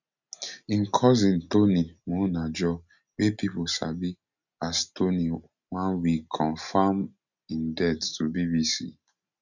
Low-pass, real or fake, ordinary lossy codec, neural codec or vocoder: 7.2 kHz; real; none; none